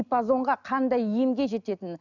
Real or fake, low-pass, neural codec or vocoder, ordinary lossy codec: real; 7.2 kHz; none; none